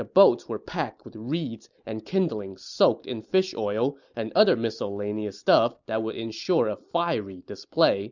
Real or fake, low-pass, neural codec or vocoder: real; 7.2 kHz; none